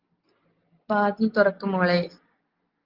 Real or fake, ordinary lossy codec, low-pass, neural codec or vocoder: real; Opus, 24 kbps; 5.4 kHz; none